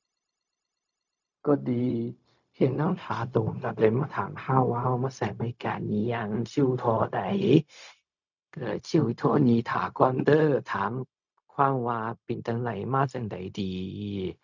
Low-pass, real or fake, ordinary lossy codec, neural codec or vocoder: 7.2 kHz; fake; none; codec, 16 kHz, 0.4 kbps, LongCat-Audio-Codec